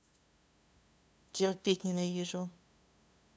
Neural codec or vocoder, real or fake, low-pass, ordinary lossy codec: codec, 16 kHz, 2 kbps, FunCodec, trained on LibriTTS, 25 frames a second; fake; none; none